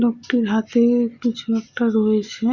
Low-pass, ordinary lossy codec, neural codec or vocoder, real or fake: 7.2 kHz; Opus, 64 kbps; none; real